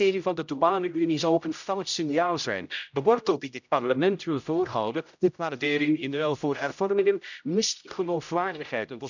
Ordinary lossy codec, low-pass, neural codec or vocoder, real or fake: none; 7.2 kHz; codec, 16 kHz, 0.5 kbps, X-Codec, HuBERT features, trained on general audio; fake